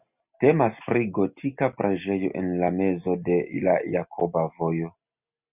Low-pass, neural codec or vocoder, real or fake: 3.6 kHz; none; real